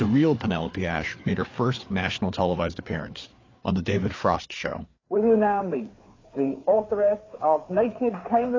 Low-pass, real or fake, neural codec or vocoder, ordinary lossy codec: 7.2 kHz; fake; codec, 16 kHz, 4 kbps, FreqCodec, larger model; AAC, 32 kbps